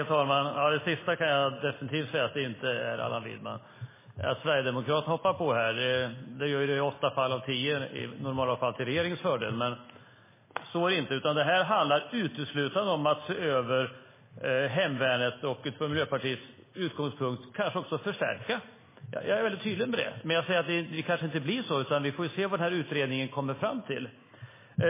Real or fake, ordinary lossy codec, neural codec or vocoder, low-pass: real; MP3, 16 kbps; none; 3.6 kHz